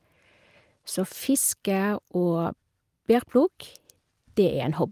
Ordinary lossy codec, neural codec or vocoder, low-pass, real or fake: Opus, 32 kbps; none; 14.4 kHz; real